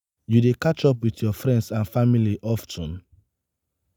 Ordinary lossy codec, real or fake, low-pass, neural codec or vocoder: none; real; none; none